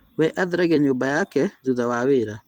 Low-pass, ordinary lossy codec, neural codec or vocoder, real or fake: 19.8 kHz; Opus, 24 kbps; vocoder, 44.1 kHz, 128 mel bands every 256 samples, BigVGAN v2; fake